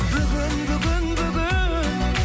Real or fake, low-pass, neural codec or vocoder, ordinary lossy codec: real; none; none; none